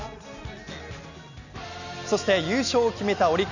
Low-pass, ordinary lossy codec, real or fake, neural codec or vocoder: 7.2 kHz; none; real; none